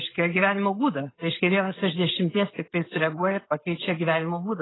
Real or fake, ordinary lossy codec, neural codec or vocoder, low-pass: real; AAC, 16 kbps; none; 7.2 kHz